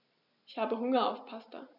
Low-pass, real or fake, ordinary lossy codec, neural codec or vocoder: 5.4 kHz; real; none; none